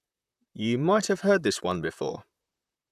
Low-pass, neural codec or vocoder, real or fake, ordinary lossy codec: 14.4 kHz; vocoder, 44.1 kHz, 128 mel bands, Pupu-Vocoder; fake; none